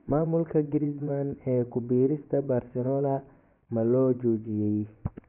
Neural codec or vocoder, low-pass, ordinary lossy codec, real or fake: vocoder, 24 kHz, 100 mel bands, Vocos; 3.6 kHz; none; fake